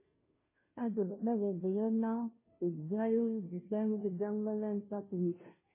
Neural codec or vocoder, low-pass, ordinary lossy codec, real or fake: codec, 16 kHz, 0.5 kbps, FunCodec, trained on Chinese and English, 25 frames a second; 3.6 kHz; MP3, 16 kbps; fake